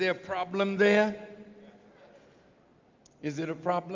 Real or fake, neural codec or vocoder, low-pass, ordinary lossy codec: real; none; 7.2 kHz; Opus, 32 kbps